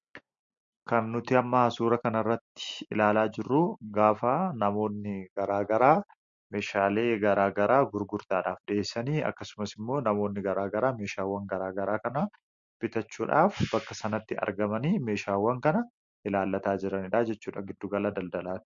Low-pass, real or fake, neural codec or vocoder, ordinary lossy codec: 7.2 kHz; real; none; MP3, 48 kbps